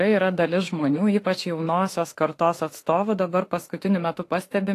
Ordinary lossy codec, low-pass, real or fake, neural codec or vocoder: AAC, 48 kbps; 14.4 kHz; fake; autoencoder, 48 kHz, 32 numbers a frame, DAC-VAE, trained on Japanese speech